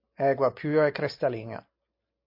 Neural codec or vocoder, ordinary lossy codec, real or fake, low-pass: none; MP3, 32 kbps; real; 5.4 kHz